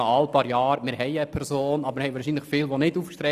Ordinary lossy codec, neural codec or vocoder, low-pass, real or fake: none; none; 14.4 kHz; real